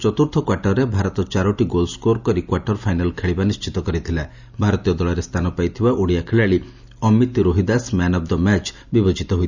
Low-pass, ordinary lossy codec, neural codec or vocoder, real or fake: 7.2 kHz; Opus, 64 kbps; none; real